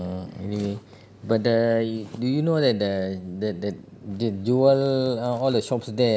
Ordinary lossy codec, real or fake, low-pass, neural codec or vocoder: none; real; none; none